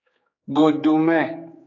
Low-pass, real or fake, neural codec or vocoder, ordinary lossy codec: 7.2 kHz; fake; codec, 16 kHz, 4 kbps, X-Codec, HuBERT features, trained on general audio; AAC, 32 kbps